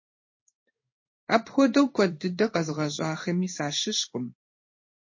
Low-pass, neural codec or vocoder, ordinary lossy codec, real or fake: 7.2 kHz; codec, 16 kHz in and 24 kHz out, 1 kbps, XY-Tokenizer; MP3, 32 kbps; fake